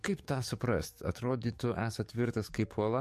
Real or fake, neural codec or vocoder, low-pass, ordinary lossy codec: fake; codec, 44.1 kHz, 7.8 kbps, DAC; 14.4 kHz; MP3, 64 kbps